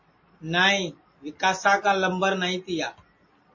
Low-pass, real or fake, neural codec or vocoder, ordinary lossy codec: 7.2 kHz; real; none; MP3, 32 kbps